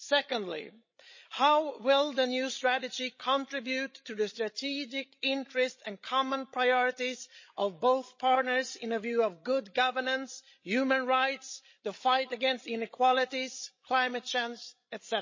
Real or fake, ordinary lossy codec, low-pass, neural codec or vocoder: fake; MP3, 32 kbps; 7.2 kHz; codec, 16 kHz, 16 kbps, FreqCodec, larger model